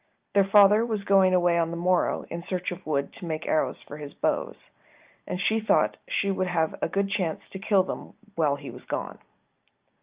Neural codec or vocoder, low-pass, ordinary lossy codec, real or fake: none; 3.6 kHz; Opus, 32 kbps; real